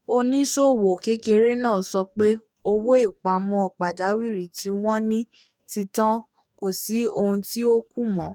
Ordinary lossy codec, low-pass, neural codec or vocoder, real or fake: none; 19.8 kHz; codec, 44.1 kHz, 2.6 kbps, DAC; fake